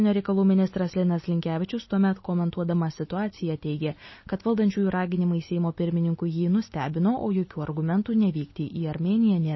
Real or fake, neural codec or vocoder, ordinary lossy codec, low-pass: real; none; MP3, 24 kbps; 7.2 kHz